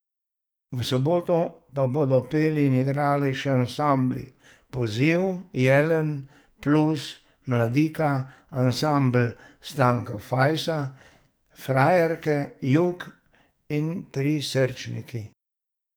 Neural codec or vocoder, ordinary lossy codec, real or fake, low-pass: codec, 44.1 kHz, 2.6 kbps, SNAC; none; fake; none